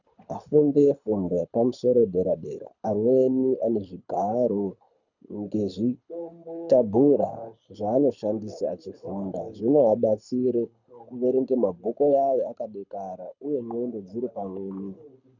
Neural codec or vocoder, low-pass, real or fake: codec, 24 kHz, 6 kbps, HILCodec; 7.2 kHz; fake